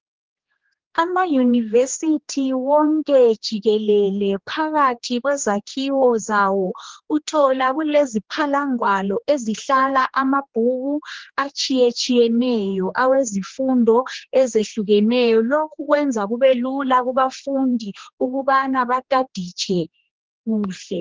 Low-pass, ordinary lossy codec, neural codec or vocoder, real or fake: 7.2 kHz; Opus, 16 kbps; codec, 16 kHz, 2 kbps, X-Codec, HuBERT features, trained on general audio; fake